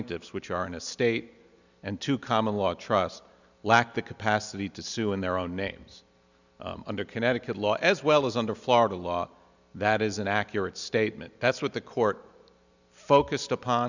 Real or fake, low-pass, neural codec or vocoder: real; 7.2 kHz; none